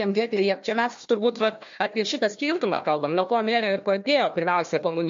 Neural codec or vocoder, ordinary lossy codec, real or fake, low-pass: codec, 16 kHz, 1 kbps, FunCodec, trained on Chinese and English, 50 frames a second; MP3, 48 kbps; fake; 7.2 kHz